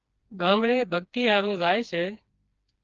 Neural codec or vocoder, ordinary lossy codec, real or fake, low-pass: codec, 16 kHz, 2 kbps, FreqCodec, smaller model; Opus, 24 kbps; fake; 7.2 kHz